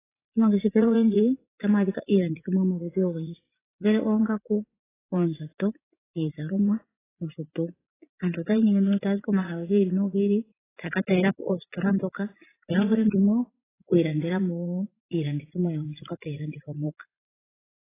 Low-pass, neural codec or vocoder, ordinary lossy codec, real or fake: 3.6 kHz; vocoder, 44.1 kHz, 80 mel bands, Vocos; AAC, 16 kbps; fake